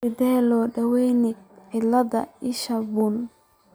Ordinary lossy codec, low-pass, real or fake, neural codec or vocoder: none; none; real; none